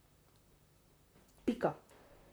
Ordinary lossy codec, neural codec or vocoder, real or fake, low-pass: none; vocoder, 44.1 kHz, 128 mel bands, Pupu-Vocoder; fake; none